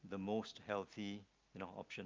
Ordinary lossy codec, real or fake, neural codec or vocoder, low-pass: Opus, 32 kbps; real; none; 7.2 kHz